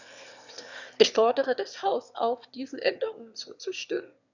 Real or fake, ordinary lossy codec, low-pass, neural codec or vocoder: fake; none; 7.2 kHz; autoencoder, 22.05 kHz, a latent of 192 numbers a frame, VITS, trained on one speaker